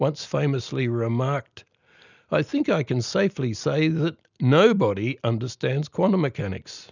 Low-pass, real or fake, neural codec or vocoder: 7.2 kHz; real; none